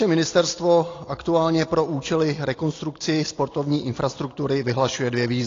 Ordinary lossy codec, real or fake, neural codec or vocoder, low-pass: AAC, 32 kbps; real; none; 7.2 kHz